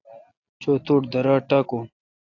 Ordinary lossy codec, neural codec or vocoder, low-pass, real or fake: AAC, 48 kbps; none; 7.2 kHz; real